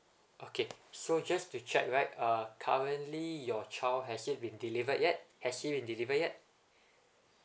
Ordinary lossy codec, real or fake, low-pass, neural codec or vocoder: none; real; none; none